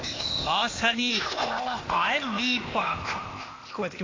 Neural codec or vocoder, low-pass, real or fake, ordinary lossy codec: codec, 16 kHz, 0.8 kbps, ZipCodec; 7.2 kHz; fake; AAC, 32 kbps